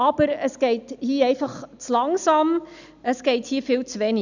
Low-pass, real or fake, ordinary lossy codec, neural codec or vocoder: 7.2 kHz; real; none; none